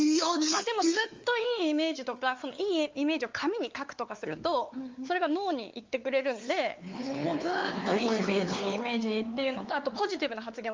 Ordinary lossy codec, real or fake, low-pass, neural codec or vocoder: Opus, 32 kbps; fake; 7.2 kHz; codec, 16 kHz, 4 kbps, X-Codec, WavLM features, trained on Multilingual LibriSpeech